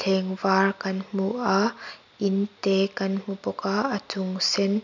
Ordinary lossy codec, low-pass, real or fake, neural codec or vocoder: none; 7.2 kHz; real; none